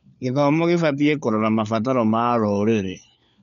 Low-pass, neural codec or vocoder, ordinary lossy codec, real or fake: 7.2 kHz; codec, 16 kHz, 4 kbps, FunCodec, trained on LibriTTS, 50 frames a second; none; fake